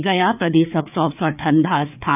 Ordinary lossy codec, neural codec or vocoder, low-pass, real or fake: none; codec, 16 kHz, 4 kbps, FreqCodec, larger model; 3.6 kHz; fake